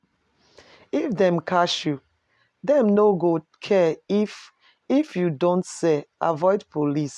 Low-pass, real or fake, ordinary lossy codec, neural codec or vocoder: none; real; none; none